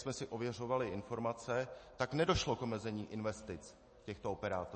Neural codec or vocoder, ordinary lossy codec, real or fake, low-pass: none; MP3, 32 kbps; real; 10.8 kHz